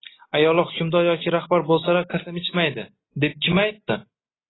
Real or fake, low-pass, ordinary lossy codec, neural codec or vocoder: real; 7.2 kHz; AAC, 16 kbps; none